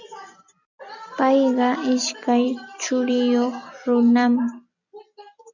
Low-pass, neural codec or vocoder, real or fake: 7.2 kHz; none; real